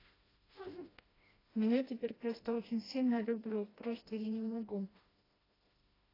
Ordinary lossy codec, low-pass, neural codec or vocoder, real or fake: AAC, 24 kbps; 5.4 kHz; codec, 16 kHz, 1 kbps, FreqCodec, smaller model; fake